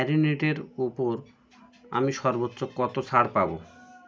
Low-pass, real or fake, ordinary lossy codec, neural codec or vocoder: none; real; none; none